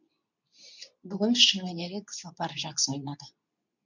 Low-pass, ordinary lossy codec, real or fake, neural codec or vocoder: 7.2 kHz; none; fake; codec, 24 kHz, 0.9 kbps, WavTokenizer, medium speech release version 2